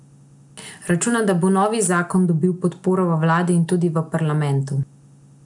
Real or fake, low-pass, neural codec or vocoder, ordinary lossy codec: real; 10.8 kHz; none; none